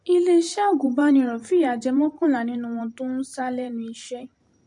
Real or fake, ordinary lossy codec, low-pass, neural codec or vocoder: fake; MP3, 48 kbps; 10.8 kHz; vocoder, 44.1 kHz, 128 mel bands every 512 samples, BigVGAN v2